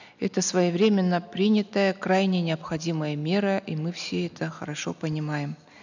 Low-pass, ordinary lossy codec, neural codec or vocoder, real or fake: 7.2 kHz; none; none; real